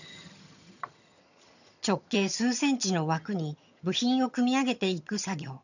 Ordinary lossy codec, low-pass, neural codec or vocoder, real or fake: none; 7.2 kHz; vocoder, 22.05 kHz, 80 mel bands, HiFi-GAN; fake